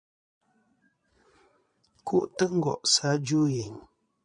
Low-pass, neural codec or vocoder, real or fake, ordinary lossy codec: 9.9 kHz; vocoder, 22.05 kHz, 80 mel bands, Vocos; fake; MP3, 96 kbps